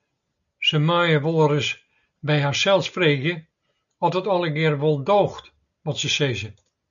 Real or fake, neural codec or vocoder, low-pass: real; none; 7.2 kHz